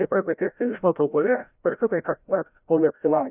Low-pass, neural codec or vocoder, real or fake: 3.6 kHz; codec, 16 kHz, 0.5 kbps, FreqCodec, larger model; fake